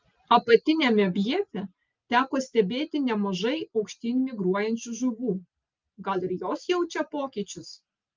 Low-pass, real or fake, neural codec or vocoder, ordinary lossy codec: 7.2 kHz; real; none; Opus, 24 kbps